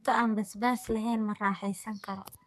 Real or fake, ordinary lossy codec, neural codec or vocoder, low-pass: fake; AAC, 96 kbps; codec, 44.1 kHz, 2.6 kbps, SNAC; 14.4 kHz